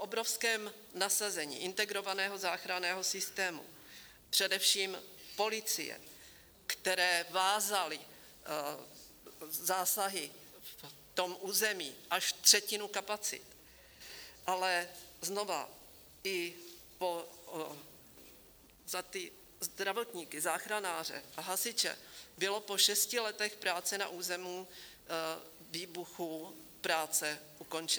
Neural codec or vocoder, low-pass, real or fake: none; 19.8 kHz; real